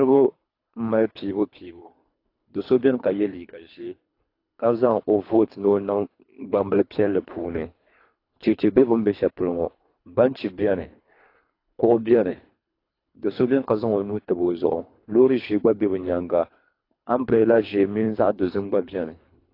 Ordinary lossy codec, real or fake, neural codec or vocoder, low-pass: AAC, 32 kbps; fake; codec, 24 kHz, 3 kbps, HILCodec; 5.4 kHz